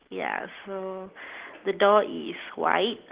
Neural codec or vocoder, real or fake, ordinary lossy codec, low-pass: none; real; Opus, 16 kbps; 3.6 kHz